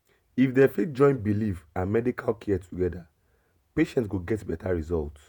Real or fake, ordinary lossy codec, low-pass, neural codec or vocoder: fake; none; none; vocoder, 48 kHz, 128 mel bands, Vocos